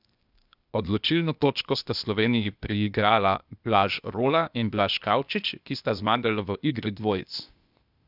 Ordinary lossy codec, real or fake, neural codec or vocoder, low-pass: none; fake; codec, 16 kHz, 0.8 kbps, ZipCodec; 5.4 kHz